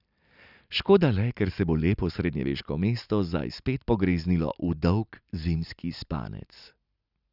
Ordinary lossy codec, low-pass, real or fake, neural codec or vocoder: none; 5.4 kHz; real; none